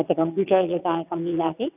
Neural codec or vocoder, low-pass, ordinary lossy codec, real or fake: vocoder, 22.05 kHz, 80 mel bands, WaveNeXt; 3.6 kHz; none; fake